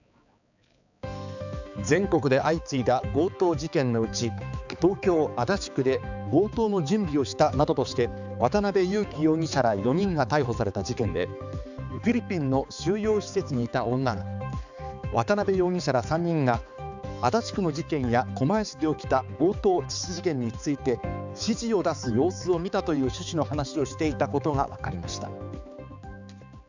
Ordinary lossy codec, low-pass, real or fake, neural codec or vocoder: none; 7.2 kHz; fake; codec, 16 kHz, 4 kbps, X-Codec, HuBERT features, trained on balanced general audio